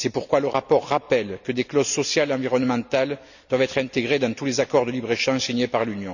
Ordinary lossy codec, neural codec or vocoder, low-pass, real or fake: none; none; 7.2 kHz; real